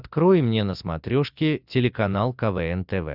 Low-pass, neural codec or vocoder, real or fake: 5.4 kHz; none; real